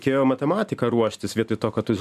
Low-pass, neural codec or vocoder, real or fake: 14.4 kHz; none; real